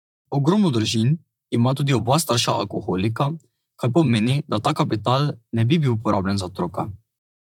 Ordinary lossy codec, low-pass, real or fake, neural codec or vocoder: none; 19.8 kHz; fake; vocoder, 44.1 kHz, 128 mel bands, Pupu-Vocoder